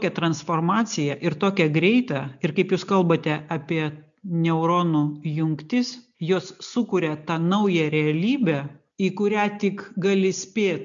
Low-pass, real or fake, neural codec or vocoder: 7.2 kHz; real; none